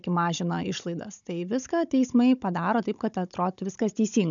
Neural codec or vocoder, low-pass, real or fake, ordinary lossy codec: codec, 16 kHz, 16 kbps, FunCodec, trained on Chinese and English, 50 frames a second; 7.2 kHz; fake; MP3, 96 kbps